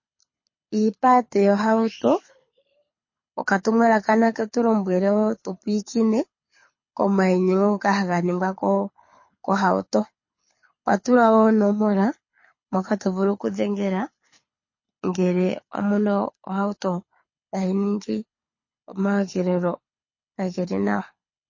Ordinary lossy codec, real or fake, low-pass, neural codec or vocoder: MP3, 32 kbps; fake; 7.2 kHz; codec, 24 kHz, 6 kbps, HILCodec